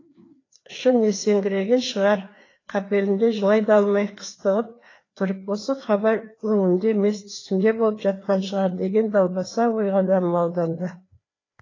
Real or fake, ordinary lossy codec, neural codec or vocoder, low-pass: fake; AAC, 32 kbps; codec, 16 kHz, 2 kbps, FreqCodec, larger model; 7.2 kHz